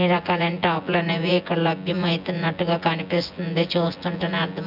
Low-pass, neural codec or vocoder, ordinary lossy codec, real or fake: 5.4 kHz; vocoder, 24 kHz, 100 mel bands, Vocos; none; fake